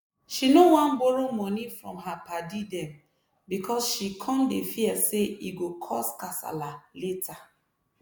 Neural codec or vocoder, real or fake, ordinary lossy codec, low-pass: none; real; none; none